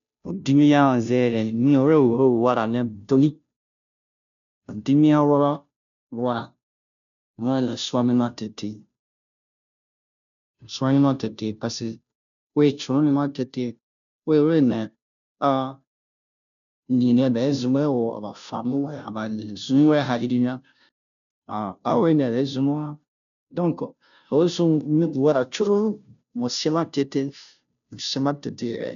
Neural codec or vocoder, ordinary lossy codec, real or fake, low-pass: codec, 16 kHz, 0.5 kbps, FunCodec, trained on Chinese and English, 25 frames a second; none; fake; 7.2 kHz